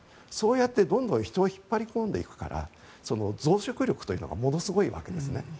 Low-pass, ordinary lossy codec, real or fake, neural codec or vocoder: none; none; real; none